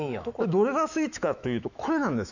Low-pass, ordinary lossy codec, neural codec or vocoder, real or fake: 7.2 kHz; none; autoencoder, 48 kHz, 128 numbers a frame, DAC-VAE, trained on Japanese speech; fake